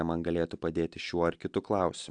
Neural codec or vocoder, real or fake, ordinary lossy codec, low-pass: none; real; AAC, 64 kbps; 9.9 kHz